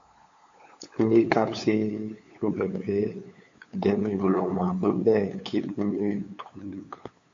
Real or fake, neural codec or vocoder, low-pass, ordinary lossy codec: fake; codec, 16 kHz, 8 kbps, FunCodec, trained on LibriTTS, 25 frames a second; 7.2 kHz; AAC, 48 kbps